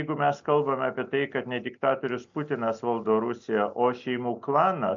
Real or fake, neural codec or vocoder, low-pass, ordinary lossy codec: real; none; 7.2 kHz; MP3, 64 kbps